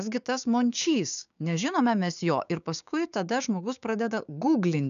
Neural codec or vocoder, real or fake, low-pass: codec, 16 kHz, 6 kbps, DAC; fake; 7.2 kHz